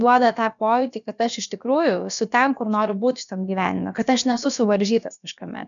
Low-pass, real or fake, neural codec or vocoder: 7.2 kHz; fake; codec, 16 kHz, about 1 kbps, DyCAST, with the encoder's durations